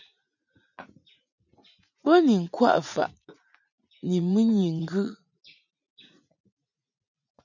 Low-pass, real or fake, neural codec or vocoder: 7.2 kHz; fake; vocoder, 44.1 kHz, 80 mel bands, Vocos